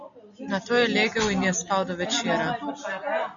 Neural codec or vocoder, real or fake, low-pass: none; real; 7.2 kHz